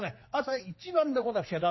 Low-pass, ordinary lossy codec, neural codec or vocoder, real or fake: 7.2 kHz; MP3, 24 kbps; codec, 16 kHz, 2 kbps, X-Codec, HuBERT features, trained on general audio; fake